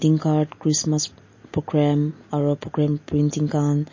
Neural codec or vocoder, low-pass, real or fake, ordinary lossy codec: none; 7.2 kHz; real; MP3, 32 kbps